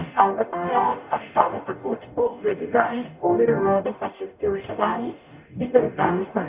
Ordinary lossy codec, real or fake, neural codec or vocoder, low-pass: Opus, 64 kbps; fake; codec, 44.1 kHz, 0.9 kbps, DAC; 3.6 kHz